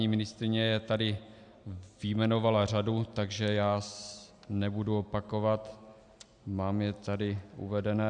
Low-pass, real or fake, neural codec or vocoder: 9.9 kHz; real; none